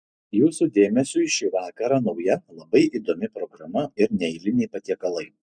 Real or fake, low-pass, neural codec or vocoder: real; 9.9 kHz; none